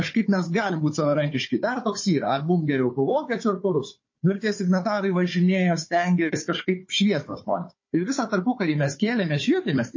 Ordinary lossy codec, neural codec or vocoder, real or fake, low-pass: MP3, 32 kbps; codec, 16 kHz, 4 kbps, FunCodec, trained on Chinese and English, 50 frames a second; fake; 7.2 kHz